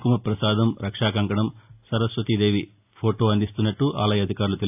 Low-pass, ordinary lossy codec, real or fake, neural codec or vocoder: 3.6 kHz; AAC, 32 kbps; real; none